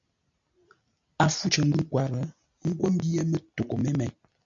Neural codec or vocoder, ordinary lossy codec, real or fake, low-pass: none; AAC, 64 kbps; real; 7.2 kHz